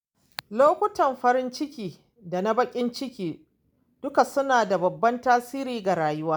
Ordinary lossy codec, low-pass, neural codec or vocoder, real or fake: none; none; none; real